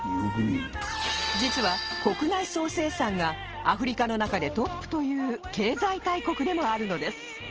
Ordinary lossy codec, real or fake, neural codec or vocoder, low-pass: Opus, 16 kbps; real; none; 7.2 kHz